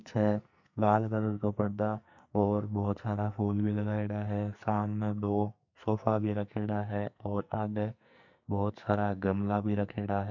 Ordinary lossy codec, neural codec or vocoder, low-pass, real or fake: none; codec, 44.1 kHz, 2.6 kbps, SNAC; 7.2 kHz; fake